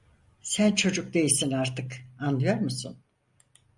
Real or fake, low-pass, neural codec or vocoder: real; 10.8 kHz; none